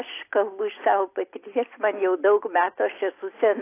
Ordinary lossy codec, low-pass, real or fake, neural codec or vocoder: AAC, 24 kbps; 3.6 kHz; real; none